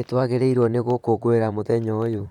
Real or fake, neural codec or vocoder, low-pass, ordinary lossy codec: fake; vocoder, 44.1 kHz, 128 mel bands, Pupu-Vocoder; 19.8 kHz; none